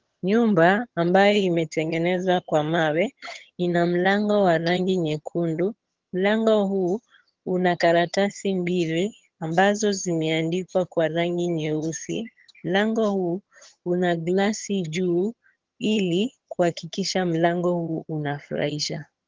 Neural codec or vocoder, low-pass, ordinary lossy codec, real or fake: vocoder, 22.05 kHz, 80 mel bands, HiFi-GAN; 7.2 kHz; Opus, 16 kbps; fake